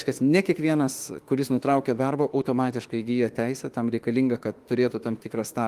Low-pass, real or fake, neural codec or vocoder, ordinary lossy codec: 14.4 kHz; fake; autoencoder, 48 kHz, 32 numbers a frame, DAC-VAE, trained on Japanese speech; Opus, 24 kbps